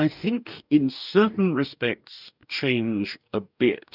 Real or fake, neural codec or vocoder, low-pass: fake; codec, 44.1 kHz, 2.6 kbps, DAC; 5.4 kHz